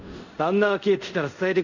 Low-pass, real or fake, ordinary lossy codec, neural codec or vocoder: 7.2 kHz; fake; none; codec, 24 kHz, 0.5 kbps, DualCodec